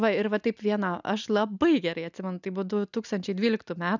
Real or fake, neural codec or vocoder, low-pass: real; none; 7.2 kHz